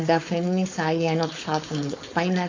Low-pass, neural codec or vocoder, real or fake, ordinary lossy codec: 7.2 kHz; codec, 16 kHz, 4.8 kbps, FACodec; fake; MP3, 48 kbps